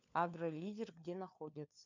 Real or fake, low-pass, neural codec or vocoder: fake; 7.2 kHz; codec, 16 kHz, 4 kbps, FunCodec, trained on LibriTTS, 50 frames a second